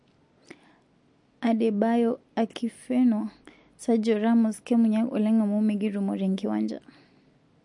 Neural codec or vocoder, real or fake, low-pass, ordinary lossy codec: none; real; 10.8 kHz; MP3, 48 kbps